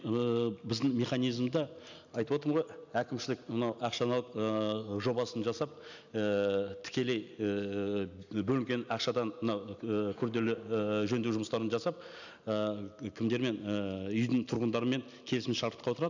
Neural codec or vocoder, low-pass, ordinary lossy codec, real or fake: none; 7.2 kHz; none; real